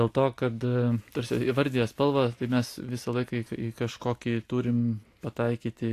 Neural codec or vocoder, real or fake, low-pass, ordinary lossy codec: none; real; 14.4 kHz; AAC, 64 kbps